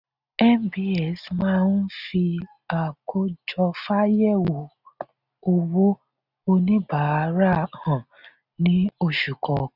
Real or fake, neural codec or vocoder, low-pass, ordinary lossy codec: real; none; 5.4 kHz; none